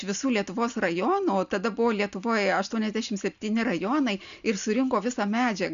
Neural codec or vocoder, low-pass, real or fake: none; 7.2 kHz; real